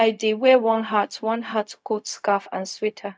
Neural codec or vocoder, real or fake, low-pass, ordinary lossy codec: codec, 16 kHz, 0.4 kbps, LongCat-Audio-Codec; fake; none; none